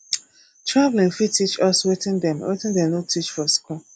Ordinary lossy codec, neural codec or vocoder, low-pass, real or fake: none; none; 9.9 kHz; real